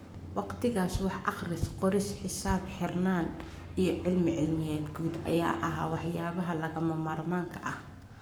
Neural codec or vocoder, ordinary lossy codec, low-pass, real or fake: codec, 44.1 kHz, 7.8 kbps, Pupu-Codec; none; none; fake